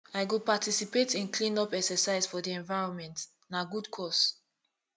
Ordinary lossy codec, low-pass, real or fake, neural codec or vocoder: none; none; real; none